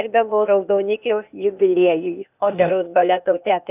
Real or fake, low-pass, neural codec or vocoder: fake; 3.6 kHz; codec, 16 kHz, 0.8 kbps, ZipCodec